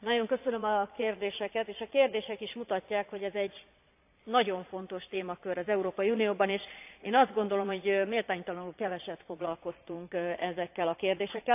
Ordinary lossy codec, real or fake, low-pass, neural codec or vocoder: AAC, 32 kbps; fake; 3.6 kHz; vocoder, 22.05 kHz, 80 mel bands, Vocos